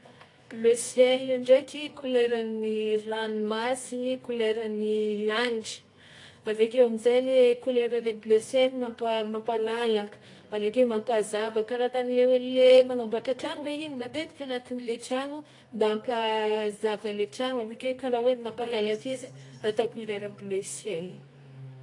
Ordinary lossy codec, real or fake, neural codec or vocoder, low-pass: AAC, 48 kbps; fake; codec, 24 kHz, 0.9 kbps, WavTokenizer, medium music audio release; 10.8 kHz